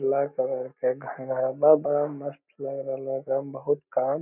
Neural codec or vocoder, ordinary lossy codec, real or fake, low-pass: none; none; real; 3.6 kHz